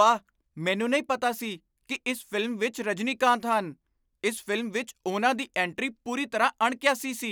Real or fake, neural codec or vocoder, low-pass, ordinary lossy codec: real; none; none; none